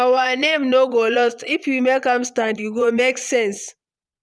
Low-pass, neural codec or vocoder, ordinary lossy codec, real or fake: none; vocoder, 22.05 kHz, 80 mel bands, Vocos; none; fake